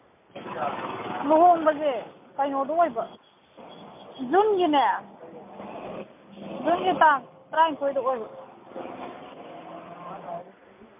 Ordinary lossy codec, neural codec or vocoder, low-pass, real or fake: MP3, 32 kbps; none; 3.6 kHz; real